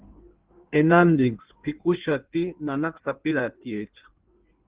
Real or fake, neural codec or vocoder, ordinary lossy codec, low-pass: fake; codec, 16 kHz in and 24 kHz out, 1.1 kbps, FireRedTTS-2 codec; Opus, 16 kbps; 3.6 kHz